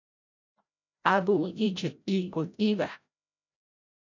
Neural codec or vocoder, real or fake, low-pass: codec, 16 kHz, 0.5 kbps, FreqCodec, larger model; fake; 7.2 kHz